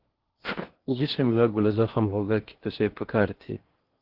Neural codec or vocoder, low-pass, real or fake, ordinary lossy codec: codec, 16 kHz in and 24 kHz out, 0.8 kbps, FocalCodec, streaming, 65536 codes; 5.4 kHz; fake; Opus, 16 kbps